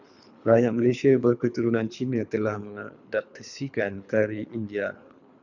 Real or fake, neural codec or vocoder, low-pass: fake; codec, 24 kHz, 3 kbps, HILCodec; 7.2 kHz